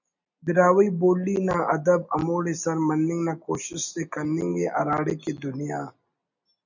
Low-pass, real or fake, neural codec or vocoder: 7.2 kHz; real; none